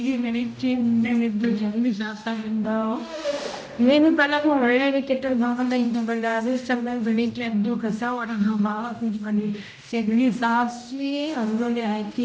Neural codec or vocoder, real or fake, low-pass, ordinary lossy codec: codec, 16 kHz, 0.5 kbps, X-Codec, HuBERT features, trained on general audio; fake; none; none